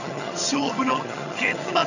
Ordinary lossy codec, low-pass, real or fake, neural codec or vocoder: none; 7.2 kHz; fake; vocoder, 22.05 kHz, 80 mel bands, HiFi-GAN